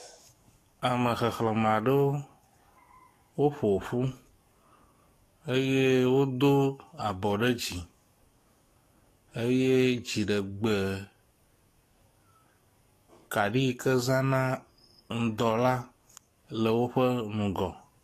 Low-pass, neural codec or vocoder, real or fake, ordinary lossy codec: 14.4 kHz; codec, 44.1 kHz, 7.8 kbps, DAC; fake; AAC, 48 kbps